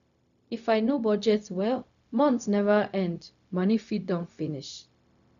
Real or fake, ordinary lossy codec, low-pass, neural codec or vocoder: fake; none; 7.2 kHz; codec, 16 kHz, 0.4 kbps, LongCat-Audio-Codec